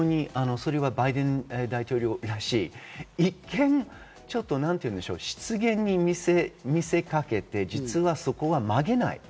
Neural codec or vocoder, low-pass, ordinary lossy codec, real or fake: none; none; none; real